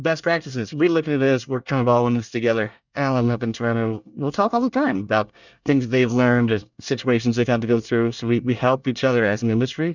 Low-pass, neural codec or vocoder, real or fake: 7.2 kHz; codec, 24 kHz, 1 kbps, SNAC; fake